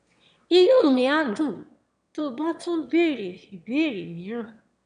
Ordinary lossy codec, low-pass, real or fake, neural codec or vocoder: MP3, 96 kbps; 9.9 kHz; fake; autoencoder, 22.05 kHz, a latent of 192 numbers a frame, VITS, trained on one speaker